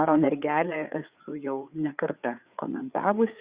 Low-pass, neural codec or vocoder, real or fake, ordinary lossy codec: 3.6 kHz; codec, 16 kHz, 4 kbps, FreqCodec, larger model; fake; Opus, 64 kbps